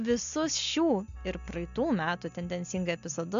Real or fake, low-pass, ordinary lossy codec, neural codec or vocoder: real; 7.2 kHz; MP3, 64 kbps; none